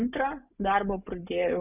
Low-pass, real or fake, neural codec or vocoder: 3.6 kHz; real; none